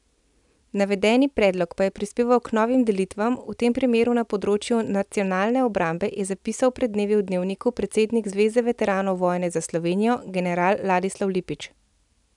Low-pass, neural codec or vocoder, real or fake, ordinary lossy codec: 10.8 kHz; none; real; none